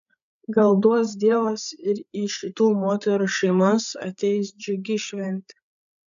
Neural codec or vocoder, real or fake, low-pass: codec, 16 kHz, 8 kbps, FreqCodec, larger model; fake; 7.2 kHz